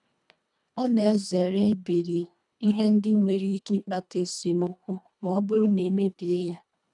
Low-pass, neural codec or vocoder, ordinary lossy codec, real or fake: 10.8 kHz; codec, 24 kHz, 1.5 kbps, HILCodec; none; fake